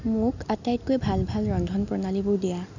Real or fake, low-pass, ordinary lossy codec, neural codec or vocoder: real; 7.2 kHz; none; none